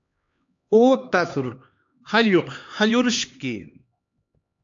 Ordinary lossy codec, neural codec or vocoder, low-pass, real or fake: AAC, 64 kbps; codec, 16 kHz, 2 kbps, X-Codec, HuBERT features, trained on LibriSpeech; 7.2 kHz; fake